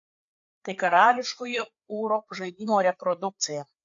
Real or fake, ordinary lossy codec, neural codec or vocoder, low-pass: fake; AAC, 48 kbps; codec, 16 kHz, 4 kbps, FreqCodec, larger model; 7.2 kHz